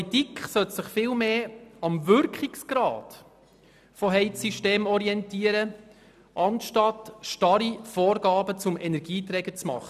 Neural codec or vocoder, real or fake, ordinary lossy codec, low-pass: none; real; none; 14.4 kHz